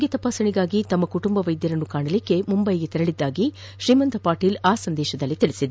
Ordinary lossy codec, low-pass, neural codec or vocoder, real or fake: none; none; none; real